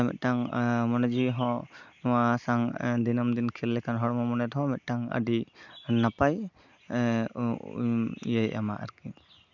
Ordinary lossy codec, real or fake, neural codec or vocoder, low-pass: none; real; none; 7.2 kHz